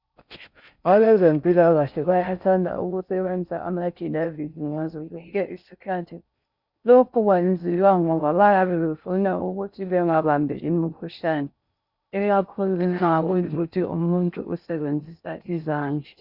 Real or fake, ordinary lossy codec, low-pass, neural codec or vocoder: fake; Opus, 64 kbps; 5.4 kHz; codec, 16 kHz in and 24 kHz out, 0.6 kbps, FocalCodec, streaming, 4096 codes